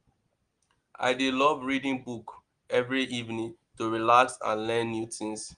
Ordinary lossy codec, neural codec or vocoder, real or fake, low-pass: Opus, 24 kbps; none; real; 9.9 kHz